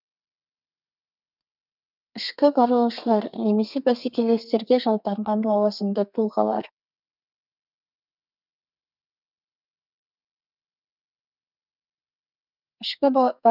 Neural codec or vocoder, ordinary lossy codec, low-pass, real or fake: codec, 32 kHz, 1.9 kbps, SNAC; none; 5.4 kHz; fake